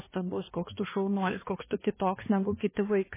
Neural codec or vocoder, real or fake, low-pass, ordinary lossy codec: codec, 16 kHz, 2 kbps, X-Codec, HuBERT features, trained on balanced general audio; fake; 3.6 kHz; MP3, 16 kbps